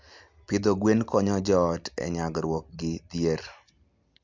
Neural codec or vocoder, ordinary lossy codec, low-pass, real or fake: none; MP3, 64 kbps; 7.2 kHz; real